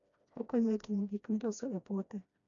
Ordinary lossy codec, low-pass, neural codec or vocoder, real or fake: none; 7.2 kHz; codec, 16 kHz, 1 kbps, FreqCodec, smaller model; fake